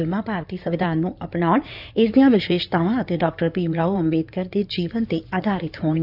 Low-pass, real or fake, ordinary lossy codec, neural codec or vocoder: 5.4 kHz; fake; none; codec, 16 kHz in and 24 kHz out, 2.2 kbps, FireRedTTS-2 codec